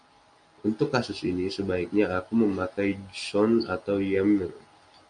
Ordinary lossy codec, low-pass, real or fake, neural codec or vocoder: MP3, 64 kbps; 9.9 kHz; real; none